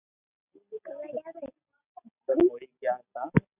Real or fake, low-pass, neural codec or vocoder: real; 3.6 kHz; none